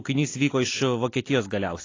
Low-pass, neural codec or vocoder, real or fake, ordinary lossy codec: 7.2 kHz; none; real; AAC, 32 kbps